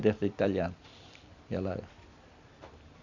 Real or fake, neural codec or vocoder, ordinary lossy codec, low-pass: fake; vocoder, 44.1 kHz, 128 mel bands every 256 samples, BigVGAN v2; none; 7.2 kHz